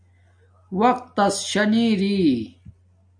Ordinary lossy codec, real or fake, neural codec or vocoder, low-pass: AAC, 64 kbps; real; none; 9.9 kHz